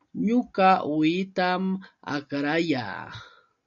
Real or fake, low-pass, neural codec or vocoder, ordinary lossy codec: real; 7.2 kHz; none; MP3, 96 kbps